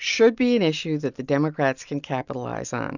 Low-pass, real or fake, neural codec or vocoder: 7.2 kHz; real; none